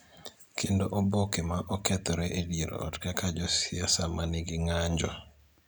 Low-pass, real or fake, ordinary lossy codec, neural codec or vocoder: none; real; none; none